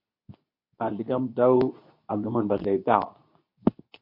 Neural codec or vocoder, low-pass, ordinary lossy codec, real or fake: codec, 24 kHz, 0.9 kbps, WavTokenizer, medium speech release version 2; 5.4 kHz; MP3, 32 kbps; fake